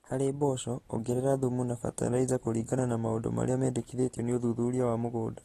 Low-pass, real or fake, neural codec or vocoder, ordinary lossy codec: 19.8 kHz; real; none; AAC, 32 kbps